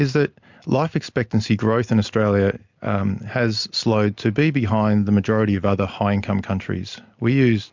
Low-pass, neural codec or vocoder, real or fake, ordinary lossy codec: 7.2 kHz; none; real; MP3, 64 kbps